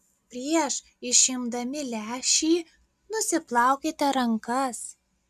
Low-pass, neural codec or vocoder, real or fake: 14.4 kHz; none; real